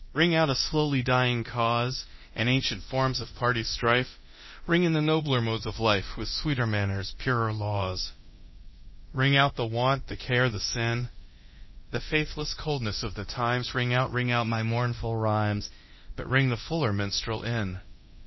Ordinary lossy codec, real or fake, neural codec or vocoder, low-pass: MP3, 24 kbps; fake; codec, 24 kHz, 0.9 kbps, DualCodec; 7.2 kHz